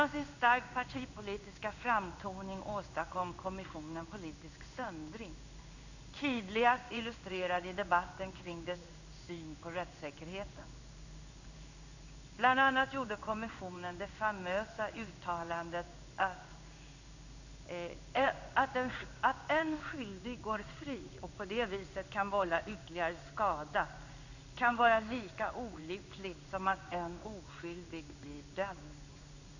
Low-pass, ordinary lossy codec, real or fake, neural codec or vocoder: 7.2 kHz; none; fake; codec, 16 kHz in and 24 kHz out, 1 kbps, XY-Tokenizer